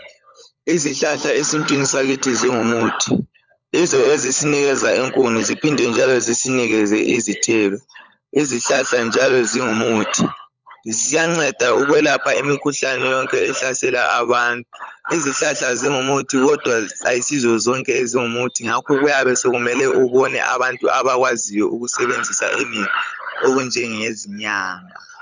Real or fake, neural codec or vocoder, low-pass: fake; codec, 16 kHz, 16 kbps, FunCodec, trained on LibriTTS, 50 frames a second; 7.2 kHz